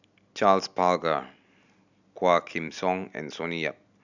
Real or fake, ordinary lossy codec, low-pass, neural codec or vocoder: real; none; 7.2 kHz; none